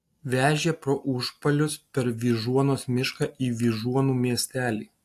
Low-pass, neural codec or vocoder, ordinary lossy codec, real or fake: 14.4 kHz; none; AAC, 64 kbps; real